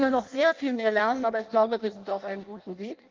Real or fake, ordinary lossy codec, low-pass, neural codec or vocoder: fake; Opus, 32 kbps; 7.2 kHz; codec, 16 kHz in and 24 kHz out, 0.6 kbps, FireRedTTS-2 codec